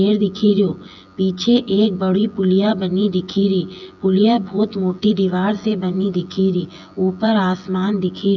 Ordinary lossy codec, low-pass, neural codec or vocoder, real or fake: none; 7.2 kHz; vocoder, 24 kHz, 100 mel bands, Vocos; fake